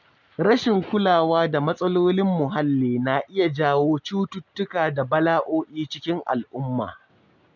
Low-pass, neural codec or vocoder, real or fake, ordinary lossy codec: 7.2 kHz; none; real; none